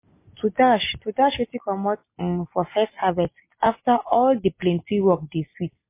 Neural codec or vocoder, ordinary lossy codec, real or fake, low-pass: none; MP3, 24 kbps; real; 3.6 kHz